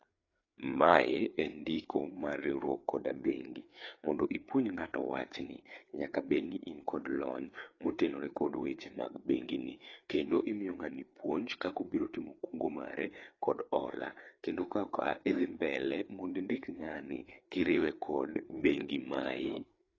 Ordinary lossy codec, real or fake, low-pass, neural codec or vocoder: AAC, 32 kbps; fake; 7.2 kHz; codec, 16 kHz, 4 kbps, FreqCodec, larger model